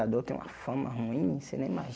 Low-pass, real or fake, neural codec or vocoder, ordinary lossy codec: none; real; none; none